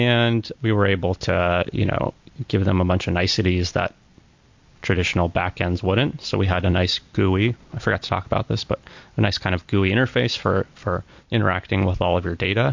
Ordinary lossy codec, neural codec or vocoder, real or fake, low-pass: MP3, 48 kbps; none; real; 7.2 kHz